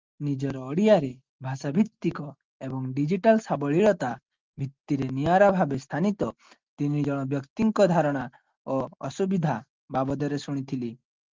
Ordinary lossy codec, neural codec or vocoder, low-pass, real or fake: Opus, 32 kbps; none; 7.2 kHz; real